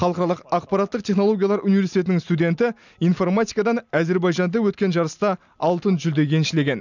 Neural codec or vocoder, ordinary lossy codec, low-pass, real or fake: none; none; 7.2 kHz; real